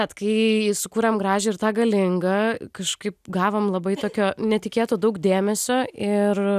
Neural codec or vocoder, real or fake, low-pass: none; real; 14.4 kHz